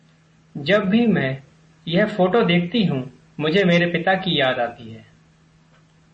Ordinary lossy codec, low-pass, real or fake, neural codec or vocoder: MP3, 32 kbps; 9.9 kHz; real; none